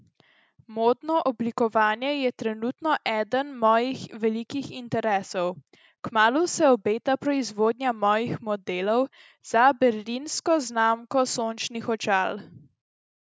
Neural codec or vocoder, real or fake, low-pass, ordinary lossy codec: none; real; none; none